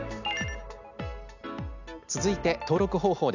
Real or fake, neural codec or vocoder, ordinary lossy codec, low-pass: real; none; none; 7.2 kHz